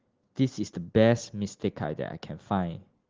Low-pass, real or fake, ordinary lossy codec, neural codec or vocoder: 7.2 kHz; real; Opus, 16 kbps; none